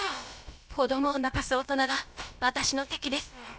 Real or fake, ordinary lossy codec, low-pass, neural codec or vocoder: fake; none; none; codec, 16 kHz, about 1 kbps, DyCAST, with the encoder's durations